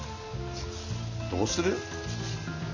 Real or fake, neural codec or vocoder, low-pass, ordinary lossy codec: real; none; 7.2 kHz; none